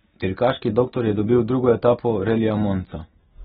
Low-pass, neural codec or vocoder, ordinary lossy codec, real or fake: 10.8 kHz; none; AAC, 16 kbps; real